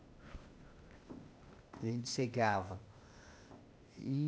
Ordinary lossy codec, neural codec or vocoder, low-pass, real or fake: none; codec, 16 kHz, 0.8 kbps, ZipCodec; none; fake